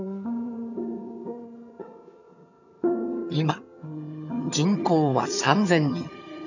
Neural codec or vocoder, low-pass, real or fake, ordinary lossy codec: vocoder, 22.05 kHz, 80 mel bands, HiFi-GAN; 7.2 kHz; fake; none